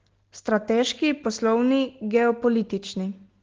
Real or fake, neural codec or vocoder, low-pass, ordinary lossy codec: real; none; 7.2 kHz; Opus, 16 kbps